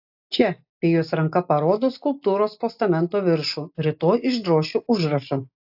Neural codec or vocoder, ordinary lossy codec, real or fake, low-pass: none; AAC, 48 kbps; real; 5.4 kHz